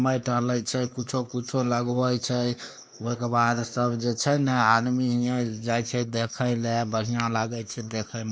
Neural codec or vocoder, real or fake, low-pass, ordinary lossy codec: codec, 16 kHz, 2 kbps, X-Codec, WavLM features, trained on Multilingual LibriSpeech; fake; none; none